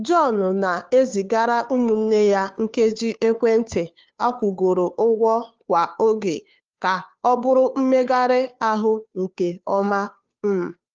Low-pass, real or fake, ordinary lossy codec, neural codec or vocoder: 7.2 kHz; fake; Opus, 32 kbps; codec, 16 kHz, 2 kbps, FunCodec, trained on Chinese and English, 25 frames a second